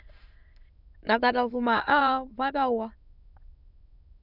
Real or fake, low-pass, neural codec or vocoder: fake; 5.4 kHz; autoencoder, 22.05 kHz, a latent of 192 numbers a frame, VITS, trained on many speakers